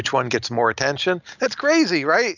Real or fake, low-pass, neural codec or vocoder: real; 7.2 kHz; none